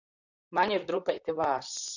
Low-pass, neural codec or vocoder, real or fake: 7.2 kHz; vocoder, 44.1 kHz, 128 mel bands, Pupu-Vocoder; fake